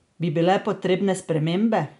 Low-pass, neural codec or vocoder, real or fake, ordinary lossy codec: 10.8 kHz; none; real; none